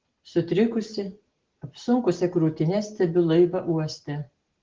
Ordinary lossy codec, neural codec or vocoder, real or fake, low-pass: Opus, 16 kbps; none; real; 7.2 kHz